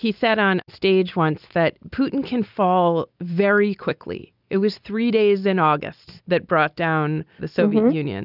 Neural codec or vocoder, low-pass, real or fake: none; 5.4 kHz; real